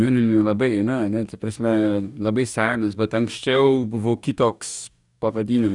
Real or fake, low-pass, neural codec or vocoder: fake; 10.8 kHz; codec, 44.1 kHz, 2.6 kbps, DAC